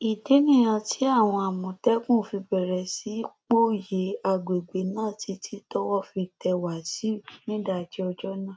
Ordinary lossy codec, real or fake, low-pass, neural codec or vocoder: none; real; none; none